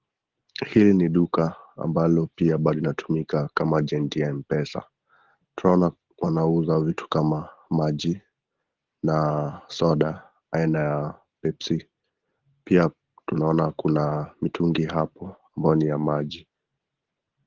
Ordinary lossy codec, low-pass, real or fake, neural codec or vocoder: Opus, 16 kbps; 7.2 kHz; real; none